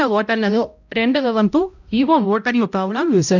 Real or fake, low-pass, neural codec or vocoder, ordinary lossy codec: fake; 7.2 kHz; codec, 16 kHz, 0.5 kbps, X-Codec, HuBERT features, trained on balanced general audio; none